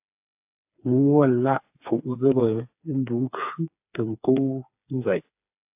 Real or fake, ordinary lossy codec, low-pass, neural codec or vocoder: fake; AAC, 32 kbps; 3.6 kHz; codec, 16 kHz, 4 kbps, FreqCodec, smaller model